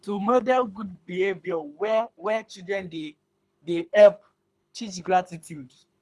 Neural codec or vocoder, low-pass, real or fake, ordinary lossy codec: codec, 24 kHz, 3 kbps, HILCodec; none; fake; none